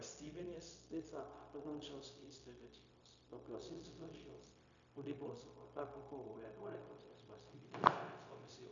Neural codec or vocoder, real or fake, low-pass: codec, 16 kHz, 0.4 kbps, LongCat-Audio-Codec; fake; 7.2 kHz